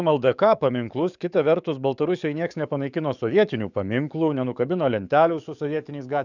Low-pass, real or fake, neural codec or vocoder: 7.2 kHz; fake; codec, 44.1 kHz, 7.8 kbps, DAC